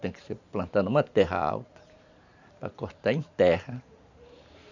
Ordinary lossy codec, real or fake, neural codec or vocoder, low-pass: none; fake; vocoder, 44.1 kHz, 128 mel bands every 512 samples, BigVGAN v2; 7.2 kHz